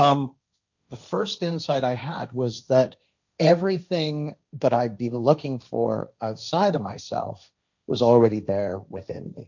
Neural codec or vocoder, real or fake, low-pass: codec, 16 kHz, 1.1 kbps, Voila-Tokenizer; fake; 7.2 kHz